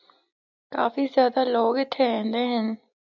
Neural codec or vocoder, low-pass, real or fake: none; 7.2 kHz; real